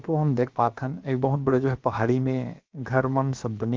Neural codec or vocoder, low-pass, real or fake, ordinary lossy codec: codec, 16 kHz, about 1 kbps, DyCAST, with the encoder's durations; 7.2 kHz; fake; Opus, 32 kbps